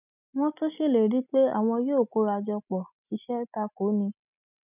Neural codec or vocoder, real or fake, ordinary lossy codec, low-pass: none; real; none; 3.6 kHz